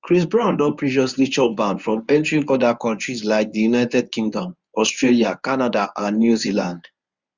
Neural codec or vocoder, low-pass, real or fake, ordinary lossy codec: codec, 24 kHz, 0.9 kbps, WavTokenizer, medium speech release version 1; 7.2 kHz; fake; Opus, 64 kbps